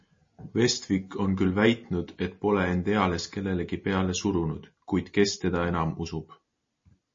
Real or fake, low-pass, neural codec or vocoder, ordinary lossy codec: real; 7.2 kHz; none; MP3, 32 kbps